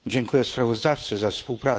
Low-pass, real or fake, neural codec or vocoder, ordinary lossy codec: none; fake; codec, 16 kHz, 2 kbps, FunCodec, trained on Chinese and English, 25 frames a second; none